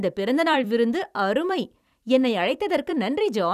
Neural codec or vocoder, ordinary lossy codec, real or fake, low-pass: vocoder, 44.1 kHz, 128 mel bands every 256 samples, BigVGAN v2; none; fake; 14.4 kHz